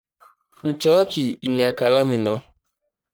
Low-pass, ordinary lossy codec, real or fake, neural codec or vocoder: none; none; fake; codec, 44.1 kHz, 1.7 kbps, Pupu-Codec